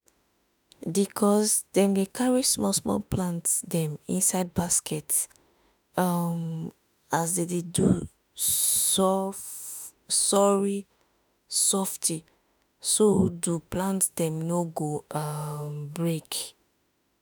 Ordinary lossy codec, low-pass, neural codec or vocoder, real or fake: none; none; autoencoder, 48 kHz, 32 numbers a frame, DAC-VAE, trained on Japanese speech; fake